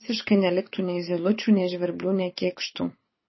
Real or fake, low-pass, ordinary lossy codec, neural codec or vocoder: fake; 7.2 kHz; MP3, 24 kbps; codec, 24 kHz, 6 kbps, HILCodec